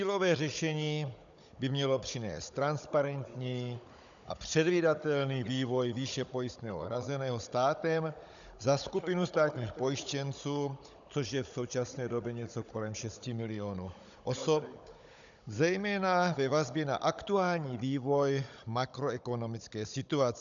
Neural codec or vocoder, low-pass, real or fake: codec, 16 kHz, 16 kbps, FunCodec, trained on Chinese and English, 50 frames a second; 7.2 kHz; fake